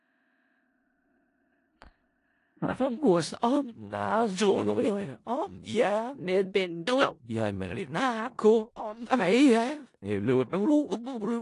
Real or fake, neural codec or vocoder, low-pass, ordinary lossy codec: fake; codec, 16 kHz in and 24 kHz out, 0.4 kbps, LongCat-Audio-Codec, four codebook decoder; 10.8 kHz; AAC, 48 kbps